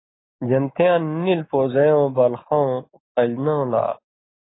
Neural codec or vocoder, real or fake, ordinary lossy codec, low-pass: none; real; AAC, 16 kbps; 7.2 kHz